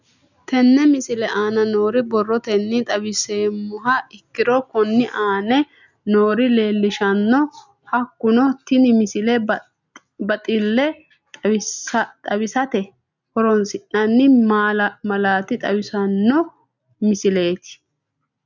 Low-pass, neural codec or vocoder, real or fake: 7.2 kHz; none; real